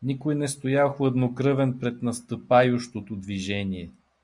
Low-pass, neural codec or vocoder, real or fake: 10.8 kHz; none; real